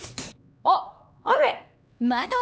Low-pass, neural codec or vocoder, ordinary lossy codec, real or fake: none; codec, 16 kHz, 2 kbps, X-Codec, HuBERT features, trained on LibriSpeech; none; fake